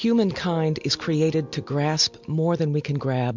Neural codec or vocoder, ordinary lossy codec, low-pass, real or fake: none; MP3, 64 kbps; 7.2 kHz; real